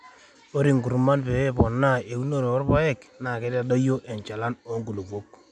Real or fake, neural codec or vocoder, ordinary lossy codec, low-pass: real; none; none; none